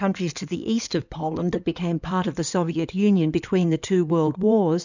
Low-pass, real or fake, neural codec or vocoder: 7.2 kHz; fake; codec, 16 kHz in and 24 kHz out, 2.2 kbps, FireRedTTS-2 codec